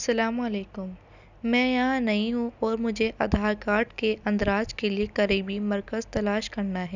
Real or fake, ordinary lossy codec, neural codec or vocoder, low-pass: real; none; none; 7.2 kHz